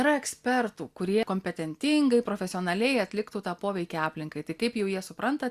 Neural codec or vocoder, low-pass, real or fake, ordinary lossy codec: none; 14.4 kHz; real; Opus, 64 kbps